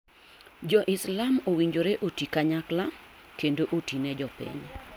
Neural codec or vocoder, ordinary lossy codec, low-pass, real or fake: none; none; none; real